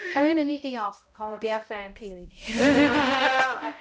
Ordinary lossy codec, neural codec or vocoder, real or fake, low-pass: none; codec, 16 kHz, 0.5 kbps, X-Codec, HuBERT features, trained on balanced general audio; fake; none